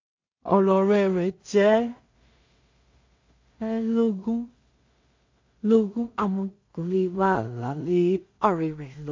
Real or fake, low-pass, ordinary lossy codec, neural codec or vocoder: fake; 7.2 kHz; MP3, 48 kbps; codec, 16 kHz in and 24 kHz out, 0.4 kbps, LongCat-Audio-Codec, two codebook decoder